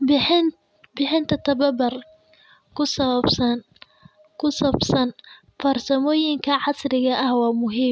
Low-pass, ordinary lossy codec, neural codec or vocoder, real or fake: none; none; none; real